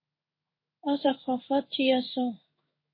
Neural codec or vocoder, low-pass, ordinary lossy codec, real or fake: codec, 16 kHz in and 24 kHz out, 1 kbps, XY-Tokenizer; 5.4 kHz; MP3, 24 kbps; fake